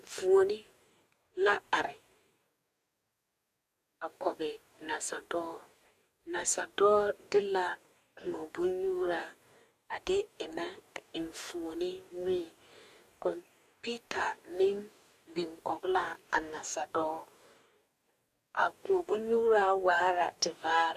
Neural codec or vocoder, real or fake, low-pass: codec, 44.1 kHz, 2.6 kbps, DAC; fake; 14.4 kHz